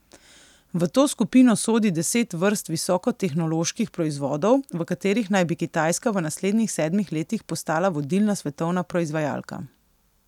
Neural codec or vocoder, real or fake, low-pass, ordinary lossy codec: none; real; 19.8 kHz; none